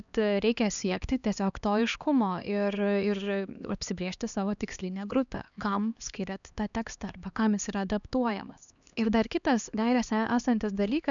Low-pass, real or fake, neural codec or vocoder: 7.2 kHz; fake; codec, 16 kHz, 2 kbps, X-Codec, HuBERT features, trained on LibriSpeech